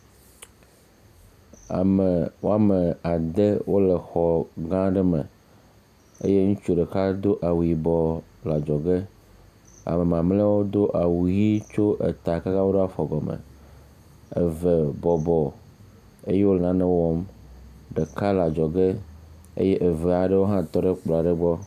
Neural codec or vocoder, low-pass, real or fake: none; 14.4 kHz; real